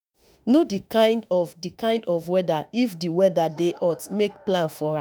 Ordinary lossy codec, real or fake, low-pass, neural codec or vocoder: none; fake; none; autoencoder, 48 kHz, 32 numbers a frame, DAC-VAE, trained on Japanese speech